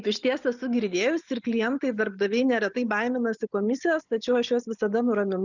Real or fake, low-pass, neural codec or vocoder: real; 7.2 kHz; none